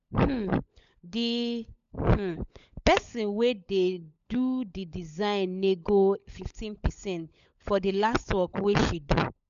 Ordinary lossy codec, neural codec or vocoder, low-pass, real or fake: none; codec, 16 kHz, 16 kbps, FunCodec, trained on LibriTTS, 50 frames a second; 7.2 kHz; fake